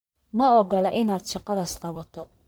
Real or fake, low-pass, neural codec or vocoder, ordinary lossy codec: fake; none; codec, 44.1 kHz, 1.7 kbps, Pupu-Codec; none